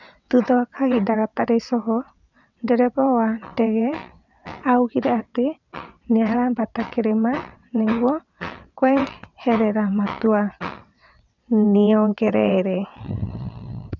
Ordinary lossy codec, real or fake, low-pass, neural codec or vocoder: none; fake; 7.2 kHz; vocoder, 22.05 kHz, 80 mel bands, WaveNeXt